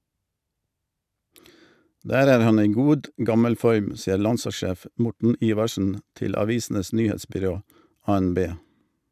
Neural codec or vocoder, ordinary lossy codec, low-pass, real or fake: none; none; 14.4 kHz; real